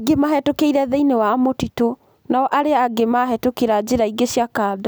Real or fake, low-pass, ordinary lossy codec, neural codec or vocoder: real; none; none; none